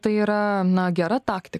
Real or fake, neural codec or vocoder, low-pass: real; none; 14.4 kHz